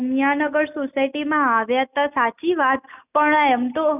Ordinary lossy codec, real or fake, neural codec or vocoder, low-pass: none; real; none; 3.6 kHz